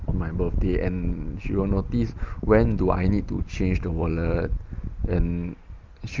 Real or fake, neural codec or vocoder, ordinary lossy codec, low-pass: real; none; Opus, 32 kbps; 7.2 kHz